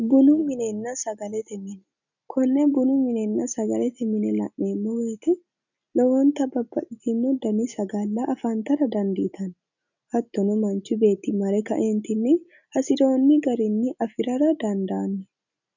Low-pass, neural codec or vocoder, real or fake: 7.2 kHz; none; real